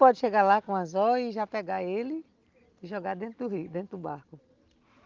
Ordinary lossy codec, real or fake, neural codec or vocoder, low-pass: Opus, 24 kbps; real; none; 7.2 kHz